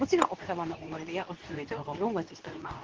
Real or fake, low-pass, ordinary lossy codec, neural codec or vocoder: fake; 7.2 kHz; Opus, 16 kbps; codec, 24 kHz, 0.9 kbps, WavTokenizer, medium speech release version 2